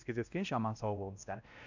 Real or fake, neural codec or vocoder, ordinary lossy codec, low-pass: fake; codec, 16 kHz, 1 kbps, X-Codec, WavLM features, trained on Multilingual LibriSpeech; none; 7.2 kHz